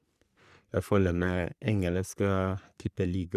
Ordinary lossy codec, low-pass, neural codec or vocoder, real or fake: none; 14.4 kHz; codec, 44.1 kHz, 3.4 kbps, Pupu-Codec; fake